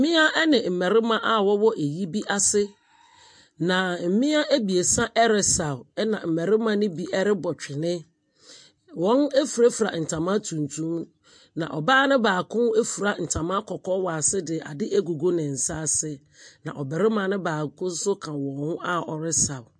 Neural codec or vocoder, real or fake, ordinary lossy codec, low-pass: none; real; MP3, 48 kbps; 9.9 kHz